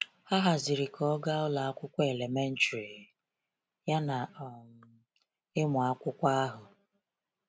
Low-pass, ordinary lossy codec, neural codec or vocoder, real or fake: none; none; none; real